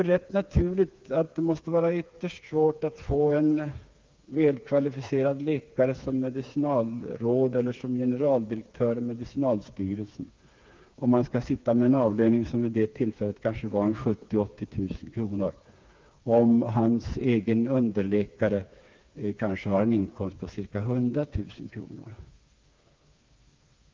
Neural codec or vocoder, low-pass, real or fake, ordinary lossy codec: codec, 16 kHz, 4 kbps, FreqCodec, smaller model; 7.2 kHz; fake; Opus, 24 kbps